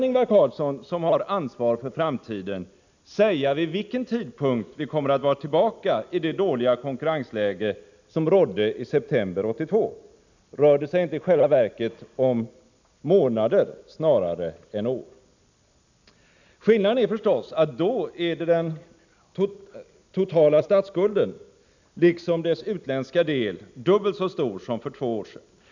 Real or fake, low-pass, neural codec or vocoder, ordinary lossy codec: real; 7.2 kHz; none; none